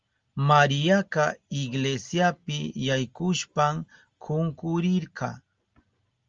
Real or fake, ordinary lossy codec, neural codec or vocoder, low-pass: real; Opus, 32 kbps; none; 7.2 kHz